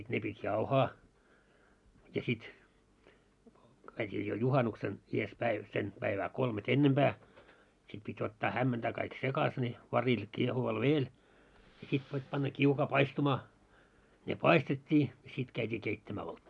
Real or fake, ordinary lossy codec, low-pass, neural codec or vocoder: real; none; 10.8 kHz; none